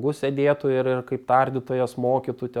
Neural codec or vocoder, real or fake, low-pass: none; real; 19.8 kHz